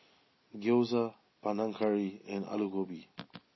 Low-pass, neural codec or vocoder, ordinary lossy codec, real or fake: 7.2 kHz; none; MP3, 24 kbps; real